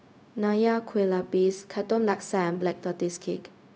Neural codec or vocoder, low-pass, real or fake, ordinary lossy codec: codec, 16 kHz, 0.4 kbps, LongCat-Audio-Codec; none; fake; none